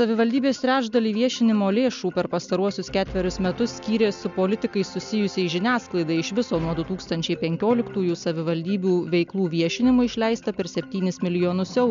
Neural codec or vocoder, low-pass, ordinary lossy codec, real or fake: none; 7.2 kHz; MP3, 64 kbps; real